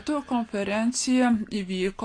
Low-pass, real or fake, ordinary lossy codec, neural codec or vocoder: 9.9 kHz; real; AAC, 48 kbps; none